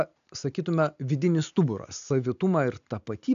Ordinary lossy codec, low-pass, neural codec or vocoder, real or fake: AAC, 96 kbps; 7.2 kHz; none; real